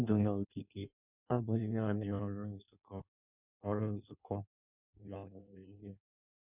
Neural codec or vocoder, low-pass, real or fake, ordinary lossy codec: codec, 16 kHz in and 24 kHz out, 0.6 kbps, FireRedTTS-2 codec; 3.6 kHz; fake; none